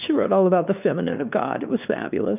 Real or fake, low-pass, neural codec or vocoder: fake; 3.6 kHz; codec, 16 kHz, 2 kbps, X-Codec, WavLM features, trained on Multilingual LibriSpeech